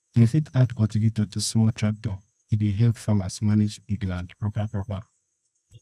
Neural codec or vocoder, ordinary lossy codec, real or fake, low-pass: codec, 24 kHz, 0.9 kbps, WavTokenizer, medium music audio release; none; fake; none